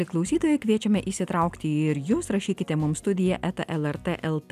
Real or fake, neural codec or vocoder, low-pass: real; none; 14.4 kHz